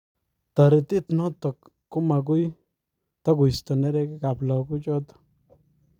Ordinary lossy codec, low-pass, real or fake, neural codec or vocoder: none; 19.8 kHz; fake; vocoder, 44.1 kHz, 128 mel bands every 256 samples, BigVGAN v2